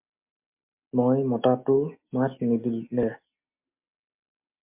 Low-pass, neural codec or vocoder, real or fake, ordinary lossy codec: 3.6 kHz; none; real; MP3, 32 kbps